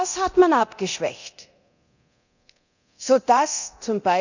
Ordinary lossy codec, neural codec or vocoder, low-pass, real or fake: none; codec, 24 kHz, 0.9 kbps, DualCodec; 7.2 kHz; fake